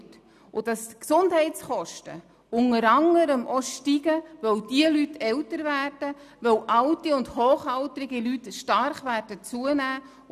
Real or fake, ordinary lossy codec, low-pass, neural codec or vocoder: real; none; 14.4 kHz; none